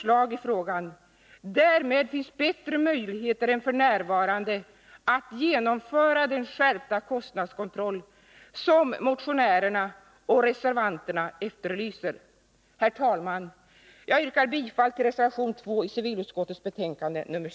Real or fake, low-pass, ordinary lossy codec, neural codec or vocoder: real; none; none; none